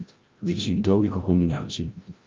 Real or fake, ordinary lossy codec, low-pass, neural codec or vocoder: fake; Opus, 24 kbps; 7.2 kHz; codec, 16 kHz, 0.5 kbps, FreqCodec, larger model